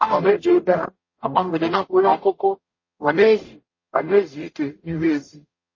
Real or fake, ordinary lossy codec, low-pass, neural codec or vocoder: fake; MP3, 32 kbps; 7.2 kHz; codec, 44.1 kHz, 0.9 kbps, DAC